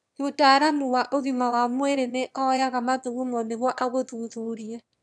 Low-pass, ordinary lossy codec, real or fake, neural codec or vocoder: none; none; fake; autoencoder, 22.05 kHz, a latent of 192 numbers a frame, VITS, trained on one speaker